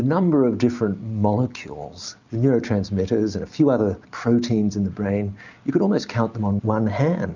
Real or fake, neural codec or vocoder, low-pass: real; none; 7.2 kHz